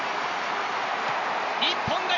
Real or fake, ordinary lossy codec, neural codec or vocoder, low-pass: real; none; none; 7.2 kHz